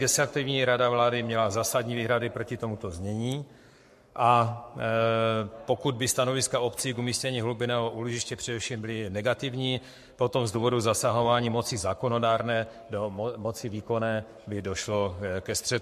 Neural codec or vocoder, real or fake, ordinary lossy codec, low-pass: codec, 44.1 kHz, 7.8 kbps, Pupu-Codec; fake; MP3, 64 kbps; 14.4 kHz